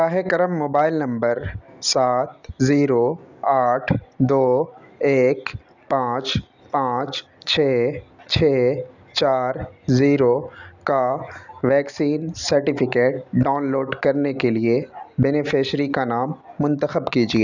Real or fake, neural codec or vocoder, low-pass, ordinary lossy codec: real; none; 7.2 kHz; none